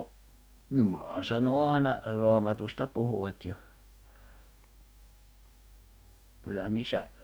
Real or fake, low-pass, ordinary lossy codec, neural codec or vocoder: fake; none; none; codec, 44.1 kHz, 2.6 kbps, DAC